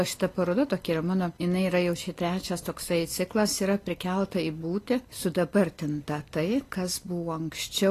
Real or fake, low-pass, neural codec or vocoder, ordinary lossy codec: real; 14.4 kHz; none; AAC, 48 kbps